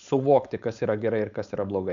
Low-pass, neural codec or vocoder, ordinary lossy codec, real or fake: 7.2 kHz; codec, 16 kHz, 4.8 kbps, FACodec; MP3, 96 kbps; fake